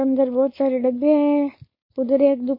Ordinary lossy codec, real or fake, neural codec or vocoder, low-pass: MP3, 24 kbps; fake; codec, 16 kHz, 4.8 kbps, FACodec; 5.4 kHz